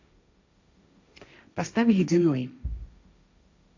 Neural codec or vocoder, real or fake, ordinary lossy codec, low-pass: codec, 16 kHz, 1.1 kbps, Voila-Tokenizer; fake; AAC, 48 kbps; 7.2 kHz